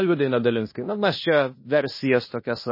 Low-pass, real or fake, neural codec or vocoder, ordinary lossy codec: 5.4 kHz; fake; codec, 16 kHz in and 24 kHz out, 0.9 kbps, LongCat-Audio-Codec, fine tuned four codebook decoder; MP3, 24 kbps